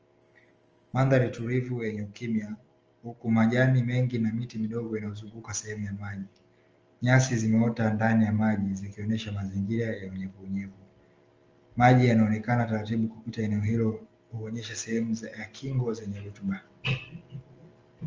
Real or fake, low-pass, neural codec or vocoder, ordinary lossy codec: real; 7.2 kHz; none; Opus, 24 kbps